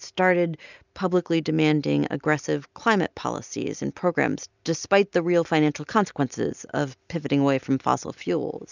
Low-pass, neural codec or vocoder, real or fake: 7.2 kHz; none; real